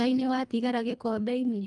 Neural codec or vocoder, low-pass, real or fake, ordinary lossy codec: codec, 24 kHz, 1.5 kbps, HILCodec; 10.8 kHz; fake; Opus, 32 kbps